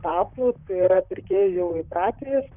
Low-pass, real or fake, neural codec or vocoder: 3.6 kHz; fake; vocoder, 44.1 kHz, 128 mel bands, Pupu-Vocoder